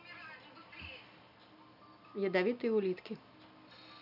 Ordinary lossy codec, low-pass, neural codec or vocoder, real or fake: none; 5.4 kHz; none; real